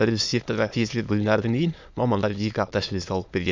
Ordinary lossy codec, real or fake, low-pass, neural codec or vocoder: MP3, 64 kbps; fake; 7.2 kHz; autoencoder, 22.05 kHz, a latent of 192 numbers a frame, VITS, trained on many speakers